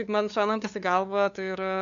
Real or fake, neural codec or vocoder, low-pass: fake; codec, 16 kHz, 2 kbps, FunCodec, trained on LibriTTS, 25 frames a second; 7.2 kHz